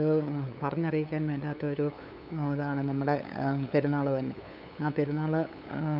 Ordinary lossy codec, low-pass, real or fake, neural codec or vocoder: none; 5.4 kHz; fake; codec, 16 kHz, 8 kbps, FunCodec, trained on LibriTTS, 25 frames a second